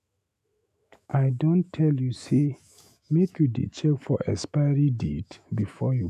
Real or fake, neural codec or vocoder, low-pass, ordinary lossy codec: fake; autoencoder, 48 kHz, 128 numbers a frame, DAC-VAE, trained on Japanese speech; 14.4 kHz; none